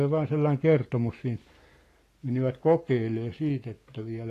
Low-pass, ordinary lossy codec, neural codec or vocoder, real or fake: 14.4 kHz; AAC, 48 kbps; codec, 44.1 kHz, 7.8 kbps, Pupu-Codec; fake